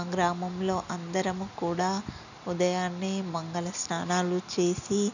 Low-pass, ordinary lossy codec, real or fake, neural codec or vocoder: 7.2 kHz; none; real; none